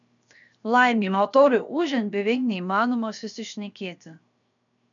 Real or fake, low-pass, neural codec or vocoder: fake; 7.2 kHz; codec, 16 kHz, 0.7 kbps, FocalCodec